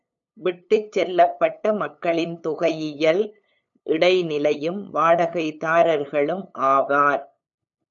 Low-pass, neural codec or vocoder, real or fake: 7.2 kHz; codec, 16 kHz, 8 kbps, FunCodec, trained on LibriTTS, 25 frames a second; fake